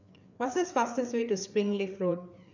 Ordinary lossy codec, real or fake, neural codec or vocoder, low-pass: none; fake; codec, 16 kHz, 4 kbps, FreqCodec, larger model; 7.2 kHz